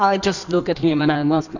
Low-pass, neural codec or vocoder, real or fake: 7.2 kHz; codec, 16 kHz in and 24 kHz out, 1.1 kbps, FireRedTTS-2 codec; fake